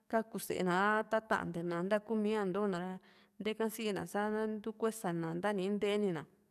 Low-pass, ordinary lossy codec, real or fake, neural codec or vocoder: 14.4 kHz; none; fake; codec, 44.1 kHz, 7.8 kbps, DAC